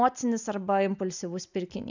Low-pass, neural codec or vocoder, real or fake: 7.2 kHz; none; real